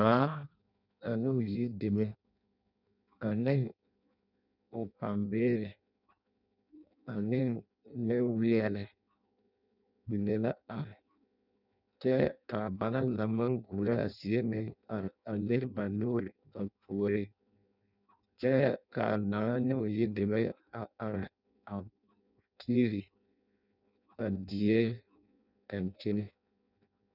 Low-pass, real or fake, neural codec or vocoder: 5.4 kHz; fake; codec, 16 kHz in and 24 kHz out, 0.6 kbps, FireRedTTS-2 codec